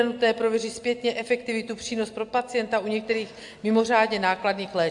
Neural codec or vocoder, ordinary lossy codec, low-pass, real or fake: none; AAC, 64 kbps; 10.8 kHz; real